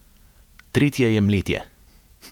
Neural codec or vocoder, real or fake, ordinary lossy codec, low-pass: vocoder, 48 kHz, 128 mel bands, Vocos; fake; none; 19.8 kHz